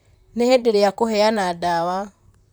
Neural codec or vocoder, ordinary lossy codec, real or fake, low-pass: vocoder, 44.1 kHz, 128 mel bands, Pupu-Vocoder; none; fake; none